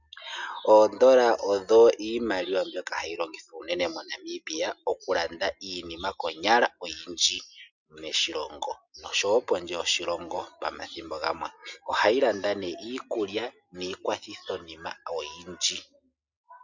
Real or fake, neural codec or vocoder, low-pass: real; none; 7.2 kHz